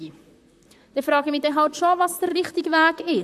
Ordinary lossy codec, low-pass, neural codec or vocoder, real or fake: AAC, 96 kbps; 14.4 kHz; vocoder, 44.1 kHz, 128 mel bands, Pupu-Vocoder; fake